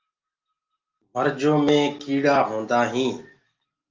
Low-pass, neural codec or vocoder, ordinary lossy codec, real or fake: 7.2 kHz; none; Opus, 32 kbps; real